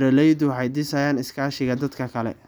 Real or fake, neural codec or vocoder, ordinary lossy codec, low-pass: real; none; none; none